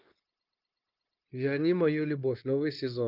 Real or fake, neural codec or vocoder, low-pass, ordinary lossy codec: fake; codec, 16 kHz, 0.9 kbps, LongCat-Audio-Codec; 5.4 kHz; Opus, 24 kbps